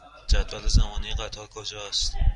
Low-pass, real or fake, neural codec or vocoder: 10.8 kHz; real; none